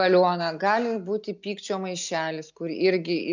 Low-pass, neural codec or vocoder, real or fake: 7.2 kHz; none; real